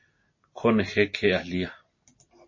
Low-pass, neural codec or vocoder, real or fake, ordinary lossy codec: 7.2 kHz; none; real; MP3, 32 kbps